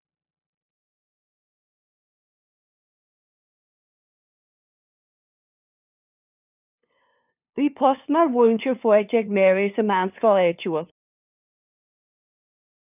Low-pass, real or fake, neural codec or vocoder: 3.6 kHz; fake; codec, 16 kHz, 2 kbps, FunCodec, trained on LibriTTS, 25 frames a second